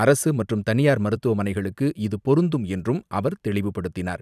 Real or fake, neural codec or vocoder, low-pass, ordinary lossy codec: fake; vocoder, 44.1 kHz, 128 mel bands every 256 samples, BigVGAN v2; 14.4 kHz; none